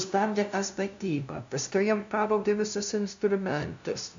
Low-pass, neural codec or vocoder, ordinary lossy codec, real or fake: 7.2 kHz; codec, 16 kHz, 0.5 kbps, FunCodec, trained on LibriTTS, 25 frames a second; MP3, 64 kbps; fake